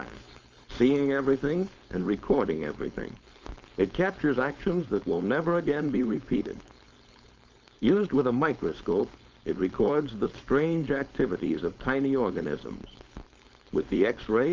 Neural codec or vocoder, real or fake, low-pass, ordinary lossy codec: codec, 16 kHz, 4.8 kbps, FACodec; fake; 7.2 kHz; Opus, 32 kbps